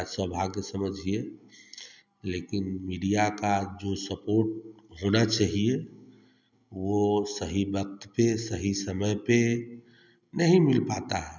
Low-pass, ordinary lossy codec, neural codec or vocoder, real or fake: 7.2 kHz; none; none; real